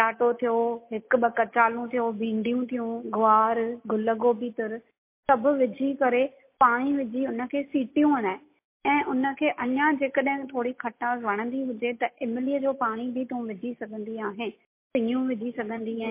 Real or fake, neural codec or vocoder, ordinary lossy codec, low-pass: real; none; MP3, 24 kbps; 3.6 kHz